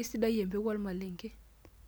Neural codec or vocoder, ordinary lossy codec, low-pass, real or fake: none; none; none; real